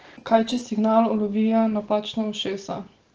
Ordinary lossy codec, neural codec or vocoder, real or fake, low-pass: Opus, 16 kbps; none; real; 7.2 kHz